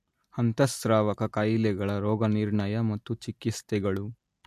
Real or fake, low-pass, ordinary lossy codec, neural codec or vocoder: real; 14.4 kHz; MP3, 64 kbps; none